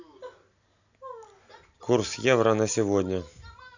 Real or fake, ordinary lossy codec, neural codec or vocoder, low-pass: real; none; none; 7.2 kHz